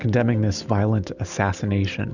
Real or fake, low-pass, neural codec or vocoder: real; 7.2 kHz; none